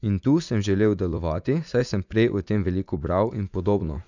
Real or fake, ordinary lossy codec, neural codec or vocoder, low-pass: fake; none; vocoder, 44.1 kHz, 80 mel bands, Vocos; 7.2 kHz